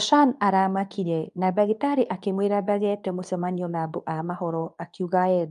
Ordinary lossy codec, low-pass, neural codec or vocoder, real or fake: none; 10.8 kHz; codec, 24 kHz, 0.9 kbps, WavTokenizer, medium speech release version 2; fake